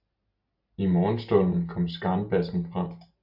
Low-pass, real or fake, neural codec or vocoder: 5.4 kHz; real; none